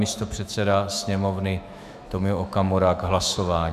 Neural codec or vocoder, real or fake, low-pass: autoencoder, 48 kHz, 128 numbers a frame, DAC-VAE, trained on Japanese speech; fake; 14.4 kHz